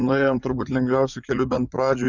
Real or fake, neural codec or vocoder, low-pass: fake; vocoder, 44.1 kHz, 80 mel bands, Vocos; 7.2 kHz